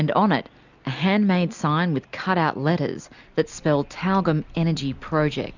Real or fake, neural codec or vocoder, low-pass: real; none; 7.2 kHz